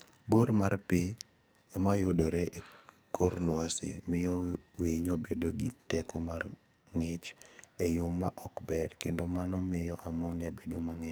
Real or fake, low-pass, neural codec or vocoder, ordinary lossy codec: fake; none; codec, 44.1 kHz, 2.6 kbps, SNAC; none